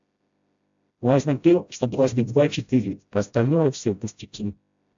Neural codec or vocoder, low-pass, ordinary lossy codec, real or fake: codec, 16 kHz, 0.5 kbps, FreqCodec, smaller model; 7.2 kHz; AAC, 64 kbps; fake